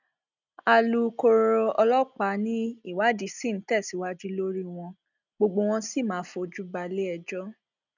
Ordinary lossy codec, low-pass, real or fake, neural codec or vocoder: none; 7.2 kHz; real; none